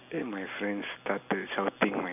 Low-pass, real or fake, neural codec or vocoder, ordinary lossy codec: 3.6 kHz; real; none; none